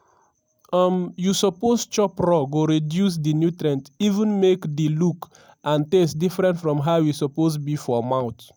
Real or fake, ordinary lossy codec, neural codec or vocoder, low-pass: real; none; none; none